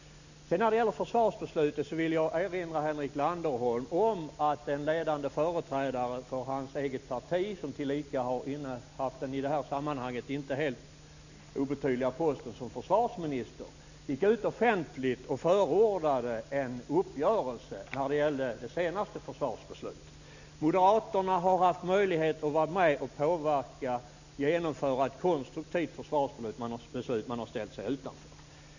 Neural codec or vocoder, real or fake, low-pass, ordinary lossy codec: none; real; 7.2 kHz; none